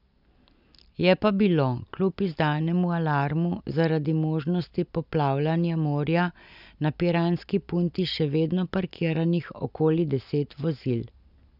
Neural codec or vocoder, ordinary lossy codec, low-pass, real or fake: none; none; 5.4 kHz; real